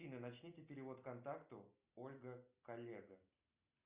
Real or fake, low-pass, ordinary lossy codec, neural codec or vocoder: real; 3.6 kHz; Opus, 64 kbps; none